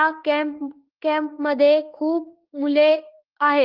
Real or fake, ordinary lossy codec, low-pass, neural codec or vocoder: fake; Opus, 16 kbps; 5.4 kHz; autoencoder, 48 kHz, 32 numbers a frame, DAC-VAE, trained on Japanese speech